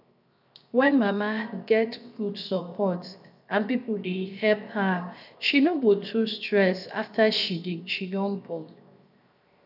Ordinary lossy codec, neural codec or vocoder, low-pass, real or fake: none; codec, 16 kHz, 0.7 kbps, FocalCodec; 5.4 kHz; fake